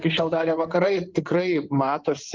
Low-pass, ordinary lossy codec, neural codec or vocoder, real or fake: 7.2 kHz; Opus, 32 kbps; codec, 16 kHz in and 24 kHz out, 2.2 kbps, FireRedTTS-2 codec; fake